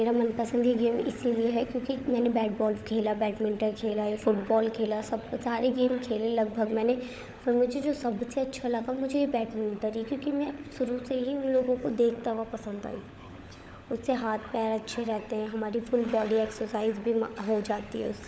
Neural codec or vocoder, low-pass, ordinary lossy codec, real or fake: codec, 16 kHz, 16 kbps, FunCodec, trained on LibriTTS, 50 frames a second; none; none; fake